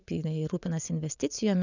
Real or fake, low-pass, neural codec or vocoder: fake; 7.2 kHz; vocoder, 22.05 kHz, 80 mel bands, WaveNeXt